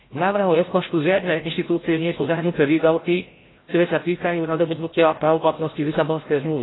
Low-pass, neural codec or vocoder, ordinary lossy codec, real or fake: 7.2 kHz; codec, 16 kHz, 0.5 kbps, FreqCodec, larger model; AAC, 16 kbps; fake